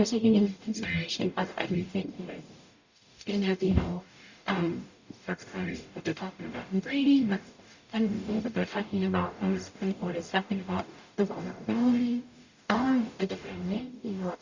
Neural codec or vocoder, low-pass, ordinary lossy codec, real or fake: codec, 44.1 kHz, 0.9 kbps, DAC; 7.2 kHz; Opus, 64 kbps; fake